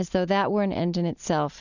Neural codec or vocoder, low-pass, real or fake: none; 7.2 kHz; real